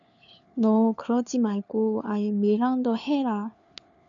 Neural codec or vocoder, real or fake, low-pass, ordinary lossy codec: codec, 16 kHz, 4 kbps, FunCodec, trained on LibriTTS, 50 frames a second; fake; 7.2 kHz; MP3, 96 kbps